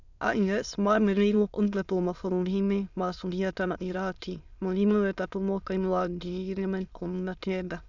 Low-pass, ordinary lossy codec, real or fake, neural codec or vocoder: 7.2 kHz; none; fake; autoencoder, 22.05 kHz, a latent of 192 numbers a frame, VITS, trained on many speakers